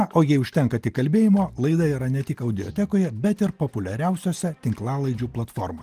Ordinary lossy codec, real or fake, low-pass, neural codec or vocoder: Opus, 24 kbps; real; 14.4 kHz; none